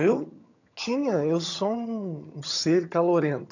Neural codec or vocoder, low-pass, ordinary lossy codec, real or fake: vocoder, 22.05 kHz, 80 mel bands, HiFi-GAN; 7.2 kHz; none; fake